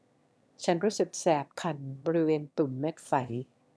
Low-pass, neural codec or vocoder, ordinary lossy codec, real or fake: none; autoencoder, 22.05 kHz, a latent of 192 numbers a frame, VITS, trained on one speaker; none; fake